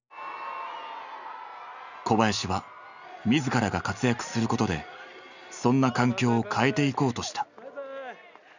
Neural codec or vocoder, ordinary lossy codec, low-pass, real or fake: none; none; 7.2 kHz; real